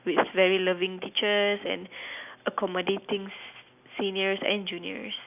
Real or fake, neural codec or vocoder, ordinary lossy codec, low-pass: real; none; none; 3.6 kHz